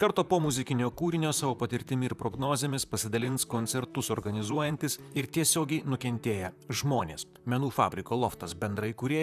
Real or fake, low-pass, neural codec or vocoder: fake; 14.4 kHz; vocoder, 44.1 kHz, 128 mel bands, Pupu-Vocoder